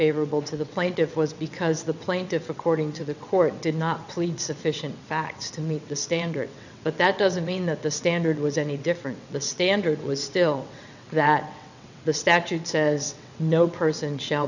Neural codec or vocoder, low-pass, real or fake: vocoder, 22.05 kHz, 80 mel bands, WaveNeXt; 7.2 kHz; fake